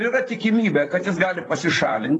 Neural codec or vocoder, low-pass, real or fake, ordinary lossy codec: vocoder, 44.1 kHz, 128 mel bands, Pupu-Vocoder; 10.8 kHz; fake; AAC, 32 kbps